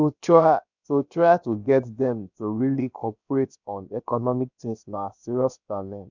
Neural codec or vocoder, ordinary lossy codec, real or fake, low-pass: codec, 16 kHz, about 1 kbps, DyCAST, with the encoder's durations; none; fake; 7.2 kHz